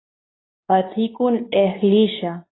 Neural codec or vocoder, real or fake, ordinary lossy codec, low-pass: codec, 24 kHz, 0.9 kbps, WavTokenizer, medium speech release version 2; fake; AAC, 16 kbps; 7.2 kHz